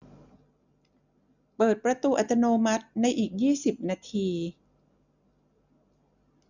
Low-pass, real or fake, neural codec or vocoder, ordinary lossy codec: 7.2 kHz; real; none; none